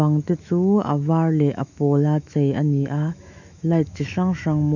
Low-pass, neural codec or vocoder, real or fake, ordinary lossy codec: 7.2 kHz; autoencoder, 48 kHz, 128 numbers a frame, DAC-VAE, trained on Japanese speech; fake; none